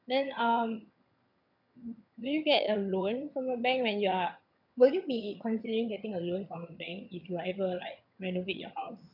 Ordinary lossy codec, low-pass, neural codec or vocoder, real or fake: none; 5.4 kHz; vocoder, 22.05 kHz, 80 mel bands, HiFi-GAN; fake